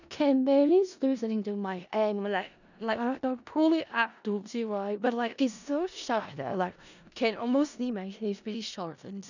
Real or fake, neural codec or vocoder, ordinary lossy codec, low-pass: fake; codec, 16 kHz in and 24 kHz out, 0.4 kbps, LongCat-Audio-Codec, four codebook decoder; none; 7.2 kHz